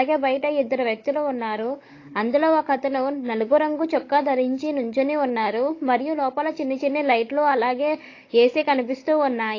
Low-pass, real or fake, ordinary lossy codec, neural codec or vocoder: 7.2 kHz; fake; AAC, 32 kbps; codec, 16 kHz, 16 kbps, FunCodec, trained on Chinese and English, 50 frames a second